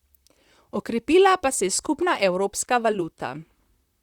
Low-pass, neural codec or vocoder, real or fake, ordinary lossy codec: 19.8 kHz; vocoder, 44.1 kHz, 128 mel bands, Pupu-Vocoder; fake; Opus, 64 kbps